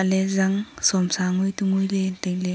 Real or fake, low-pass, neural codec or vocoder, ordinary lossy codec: real; none; none; none